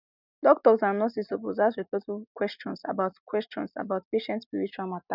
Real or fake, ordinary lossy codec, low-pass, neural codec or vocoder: real; none; 5.4 kHz; none